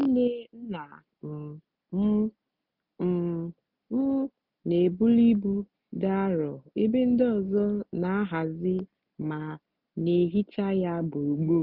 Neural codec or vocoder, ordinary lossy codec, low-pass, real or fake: none; none; 5.4 kHz; real